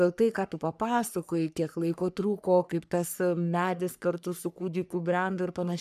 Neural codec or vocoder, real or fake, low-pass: codec, 44.1 kHz, 3.4 kbps, Pupu-Codec; fake; 14.4 kHz